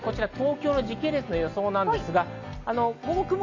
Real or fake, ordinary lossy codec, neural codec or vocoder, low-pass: real; AAC, 48 kbps; none; 7.2 kHz